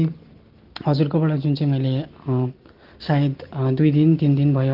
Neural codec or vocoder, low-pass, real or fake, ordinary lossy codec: none; 5.4 kHz; real; Opus, 16 kbps